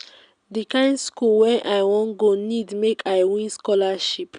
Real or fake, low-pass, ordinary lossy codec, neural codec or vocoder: real; 9.9 kHz; none; none